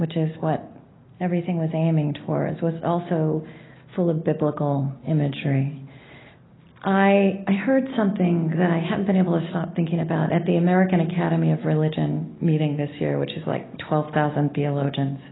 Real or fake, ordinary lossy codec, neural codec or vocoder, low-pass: real; AAC, 16 kbps; none; 7.2 kHz